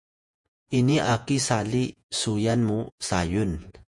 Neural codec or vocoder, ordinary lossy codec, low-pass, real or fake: vocoder, 48 kHz, 128 mel bands, Vocos; MP3, 64 kbps; 10.8 kHz; fake